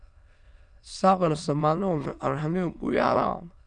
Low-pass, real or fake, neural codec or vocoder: 9.9 kHz; fake; autoencoder, 22.05 kHz, a latent of 192 numbers a frame, VITS, trained on many speakers